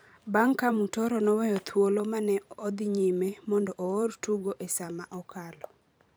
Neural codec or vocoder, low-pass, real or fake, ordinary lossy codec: vocoder, 44.1 kHz, 128 mel bands every 512 samples, BigVGAN v2; none; fake; none